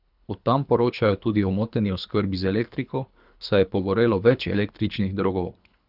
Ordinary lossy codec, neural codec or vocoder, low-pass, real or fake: none; codec, 24 kHz, 3 kbps, HILCodec; 5.4 kHz; fake